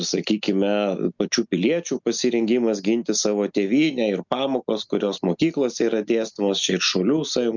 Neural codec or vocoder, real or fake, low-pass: none; real; 7.2 kHz